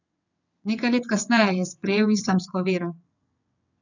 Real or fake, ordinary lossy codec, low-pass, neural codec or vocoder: fake; none; 7.2 kHz; vocoder, 22.05 kHz, 80 mel bands, WaveNeXt